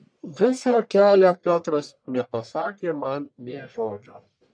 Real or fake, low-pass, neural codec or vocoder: fake; 9.9 kHz; codec, 44.1 kHz, 1.7 kbps, Pupu-Codec